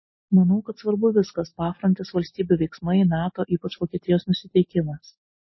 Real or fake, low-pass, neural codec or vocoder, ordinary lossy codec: real; 7.2 kHz; none; MP3, 24 kbps